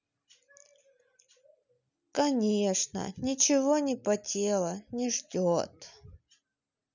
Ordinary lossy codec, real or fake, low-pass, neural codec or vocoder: none; real; 7.2 kHz; none